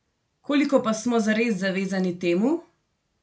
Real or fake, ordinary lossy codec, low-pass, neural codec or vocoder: real; none; none; none